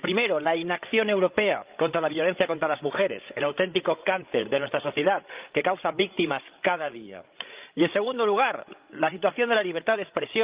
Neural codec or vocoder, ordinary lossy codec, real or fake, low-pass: codec, 16 kHz, 16 kbps, FreqCodec, larger model; Opus, 32 kbps; fake; 3.6 kHz